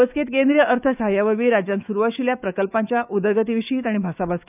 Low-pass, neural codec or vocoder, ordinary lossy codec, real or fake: 3.6 kHz; autoencoder, 48 kHz, 128 numbers a frame, DAC-VAE, trained on Japanese speech; none; fake